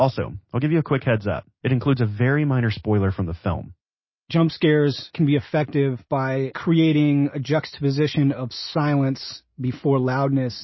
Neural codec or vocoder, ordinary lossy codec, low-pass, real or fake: none; MP3, 24 kbps; 7.2 kHz; real